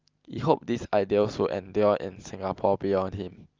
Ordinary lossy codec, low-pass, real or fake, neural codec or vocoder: Opus, 24 kbps; 7.2 kHz; real; none